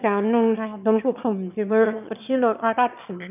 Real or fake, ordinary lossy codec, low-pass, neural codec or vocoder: fake; none; 3.6 kHz; autoencoder, 22.05 kHz, a latent of 192 numbers a frame, VITS, trained on one speaker